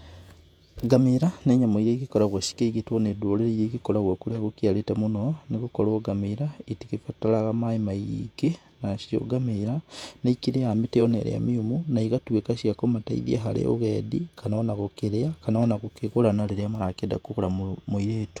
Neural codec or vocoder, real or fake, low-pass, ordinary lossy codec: vocoder, 48 kHz, 128 mel bands, Vocos; fake; 19.8 kHz; none